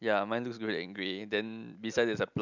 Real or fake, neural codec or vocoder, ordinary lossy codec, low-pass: real; none; none; 7.2 kHz